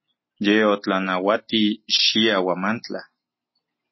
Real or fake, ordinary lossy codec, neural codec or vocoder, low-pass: real; MP3, 24 kbps; none; 7.2 kHz